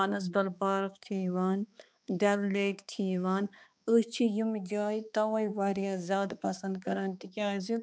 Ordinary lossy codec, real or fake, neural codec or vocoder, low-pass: none; fake; codec, 16 kHz, 2 kbps, X-Codec, HuBERT features, trained on balanced general audio; none